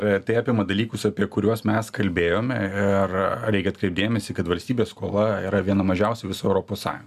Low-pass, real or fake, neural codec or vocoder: 14.4 kHz; real; none